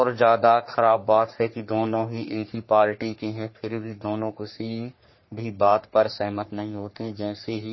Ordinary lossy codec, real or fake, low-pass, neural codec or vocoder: MP3, 24 kbps; fake; 7.2 kHz; codec, 44.1 kHz, 3.4 kbps, Pupu-Codec